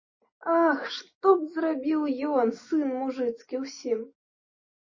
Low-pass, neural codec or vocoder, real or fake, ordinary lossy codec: 7.2 kHz; none; real; MP3, 32 kbps